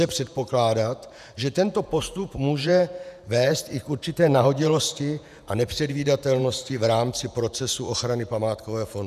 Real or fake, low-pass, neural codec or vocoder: fake; 14.4 kHz; vocoder, 48 kHz, 128 mel bands, Vocos